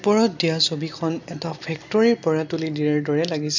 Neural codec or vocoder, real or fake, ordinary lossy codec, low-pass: none; real; none; 7.2 kHz